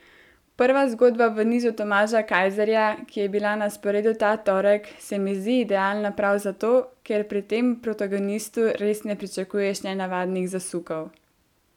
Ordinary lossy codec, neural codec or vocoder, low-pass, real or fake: none; none; 19.8 kHz; real